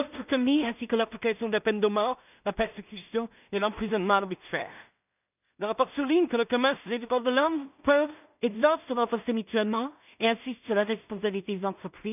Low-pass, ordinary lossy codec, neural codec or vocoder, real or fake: 3.6 kHz; none; codec, 16 kHz in and 24 kHz out, 0.4 kbps, LongCat-Audio-Codec, two codebook decoder; fake